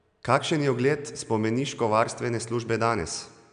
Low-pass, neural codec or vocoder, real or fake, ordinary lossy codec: 9.9 kHz; none; real; none